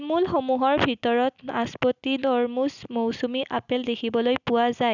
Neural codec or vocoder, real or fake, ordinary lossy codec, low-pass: none; real; none; 7.2 kHz